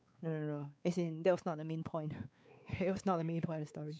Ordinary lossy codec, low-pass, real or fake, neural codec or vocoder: none; none; fake; codec, 16 kHz, 4 kbps, X-Codec, WavLM features, trained on Multilingual LibriSpeech